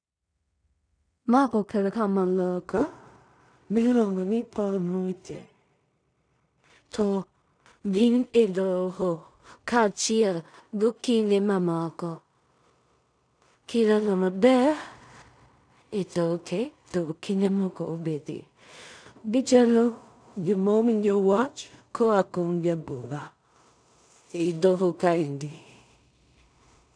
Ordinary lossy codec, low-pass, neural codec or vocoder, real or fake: none; 9.9 kHz; codec, 16 kHz in and 24 kHz out, 0.4 kbps, LongCat-Audio-Codec, two codebook decoder; fake